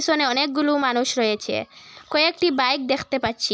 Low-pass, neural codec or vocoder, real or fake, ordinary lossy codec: none; none; real; none